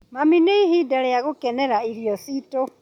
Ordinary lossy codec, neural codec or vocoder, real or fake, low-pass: none; none; real; 19.8 kHz